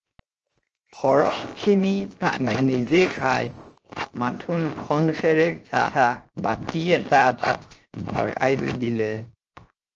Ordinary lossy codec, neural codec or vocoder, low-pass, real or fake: Opus, 24 kbps; codec, 16 kHz, 0.7 kbps, FocalCodec; 7.2 kHz; fake